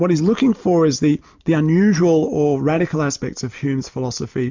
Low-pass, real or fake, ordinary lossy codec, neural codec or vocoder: 7.2 kHz; real; MP3, 64 kbps; none